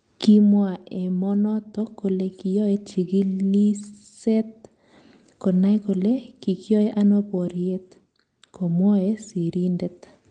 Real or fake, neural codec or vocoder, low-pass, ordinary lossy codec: real; none; 9.9 kHz; Opus, 32 kbps